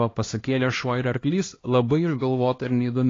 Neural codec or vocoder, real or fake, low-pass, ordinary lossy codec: codec, 16 kHz, 1 kbps, X-Codec, HuBERT features, trained on LibriSpeech; fake; 7.2 kHz; AAC, 32 kbps